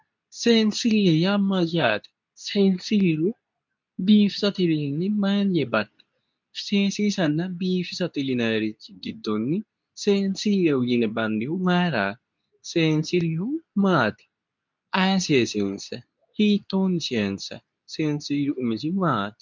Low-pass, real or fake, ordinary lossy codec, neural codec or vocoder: 7.2 kHz; fake; MP3, 64 kbps; codec, 24 kHz, 0.9 kbps, WavTokenizer, medium speech release version 2